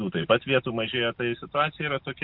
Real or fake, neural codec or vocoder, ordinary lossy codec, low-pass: real; none; MP3, 48 kbps; 5.4 kHz